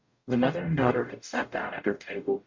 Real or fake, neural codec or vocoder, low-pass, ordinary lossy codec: fake; codec, 44.1 kHz, 0.9 kbps, DAC; 7.2 kHz; MP3, 48 kbps